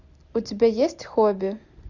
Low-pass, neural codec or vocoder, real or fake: 7.2 kHz; none; real